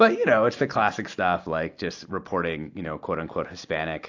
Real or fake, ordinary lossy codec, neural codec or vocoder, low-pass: real; AAC, 48 kbps; none; 7.2 kHz